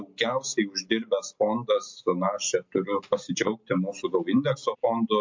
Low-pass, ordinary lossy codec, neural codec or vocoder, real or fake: 7.2 kHz; MP3, 48 kbps; none; real